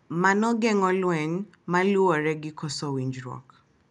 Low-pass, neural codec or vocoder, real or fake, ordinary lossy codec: 10.8 kHz; none; real; none